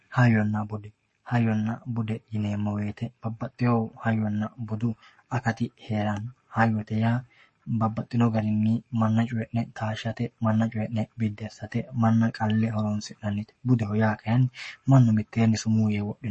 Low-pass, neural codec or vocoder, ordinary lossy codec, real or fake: 10.8 kHz; codec, 44.1 kHz, 7.8 kbps, Pupu-Codec; MP3, 32 kbps; fake